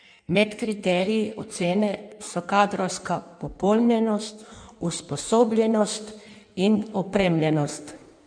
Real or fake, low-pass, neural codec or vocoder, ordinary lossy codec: fake; 9.9 kHz; codec, 16 kHz in and 24 kHz out, 1.1 kbps, FireRedTTS-2 codec; none